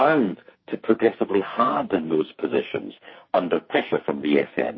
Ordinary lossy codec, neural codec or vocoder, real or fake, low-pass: MP3, 24 kbps; codec, 44.1 kHz, 2.6 kbps, SNAC; fake; 7.2 kHz